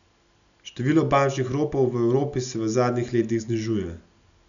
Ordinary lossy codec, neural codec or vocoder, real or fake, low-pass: none; none; real; 7.2 kHz